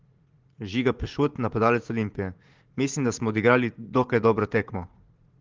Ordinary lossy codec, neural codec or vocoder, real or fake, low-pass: Opus, 16 kbps; vocoder, 44.1 kHz, 80 mel bands, Vocos; fake; 7.2 kHz